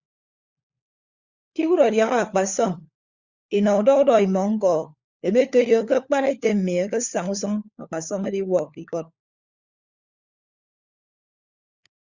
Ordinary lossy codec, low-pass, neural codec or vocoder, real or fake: Opus, 64 kbps; 7.2 kHz; codec, 16 kHz, 4 kbps, FunCodec, trained on LibriTTS, 50 frames a second; fake